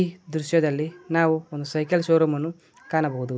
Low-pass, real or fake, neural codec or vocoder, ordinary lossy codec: none; real; none; none